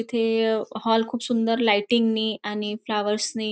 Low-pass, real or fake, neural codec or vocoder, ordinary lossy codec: none; real; none; none